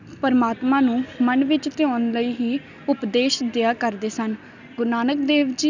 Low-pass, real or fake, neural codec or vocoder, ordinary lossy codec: 7.2 kHz; real; none; none